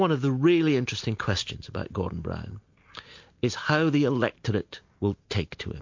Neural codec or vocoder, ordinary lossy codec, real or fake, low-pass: none; MP3, 48 kbps; real; 7.2 kHz